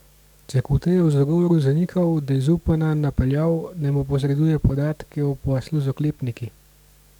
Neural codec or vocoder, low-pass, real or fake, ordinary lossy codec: codec, 44.1 kHz, 7.8 kbps, DAC; 19.8 kHz; fake; none